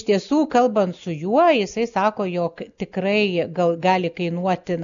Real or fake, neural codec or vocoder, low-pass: real; none; 7.2 kHz